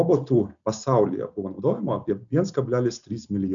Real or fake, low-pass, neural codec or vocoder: real; 7.2 kHz; none